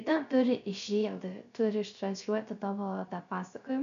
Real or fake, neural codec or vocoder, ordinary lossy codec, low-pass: fake; codec, 16 kHz, 0.3 kbps, FocalCodec; AAC, 64 kbps; 7.2 kHz